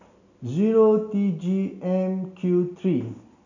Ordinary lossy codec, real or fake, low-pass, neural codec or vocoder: none; real; 7.2 kHz; none